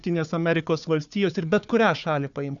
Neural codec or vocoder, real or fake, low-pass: codec, 16 kHz, 4 kbps, FunCodec, trained on Chinese and English, 50 frames a second; fake; 7.2 kHz